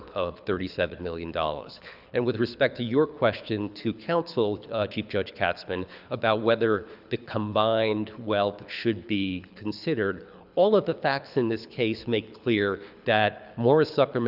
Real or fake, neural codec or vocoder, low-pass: fake; codec, 24 kHz, 6 kbps, HILCodec; 5.4 kHz